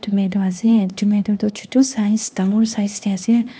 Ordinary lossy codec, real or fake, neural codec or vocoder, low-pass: none; fake; codec, 16 kHz, 2 kbps, X-Codec, HuBERT features, trained on LibriSpeech; none